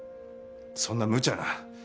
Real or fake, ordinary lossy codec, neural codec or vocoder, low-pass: real; none; none; none